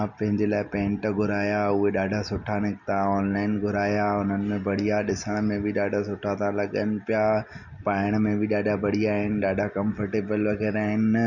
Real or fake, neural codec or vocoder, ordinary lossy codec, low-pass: real; none; none; 7.2 kHz